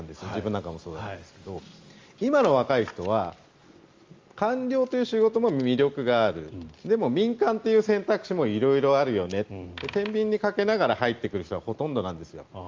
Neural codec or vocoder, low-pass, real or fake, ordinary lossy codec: none; 7.2 kHz; real; Opus, 32 kbps